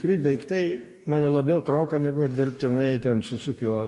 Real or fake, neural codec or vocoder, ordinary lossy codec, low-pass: fake; codec, 44.1 kHz, 2.6 kbps, DAC; MP3, 48 kbps; 14.4 kHz